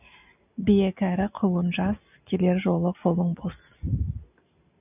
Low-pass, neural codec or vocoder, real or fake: 3.6 kHz; none; real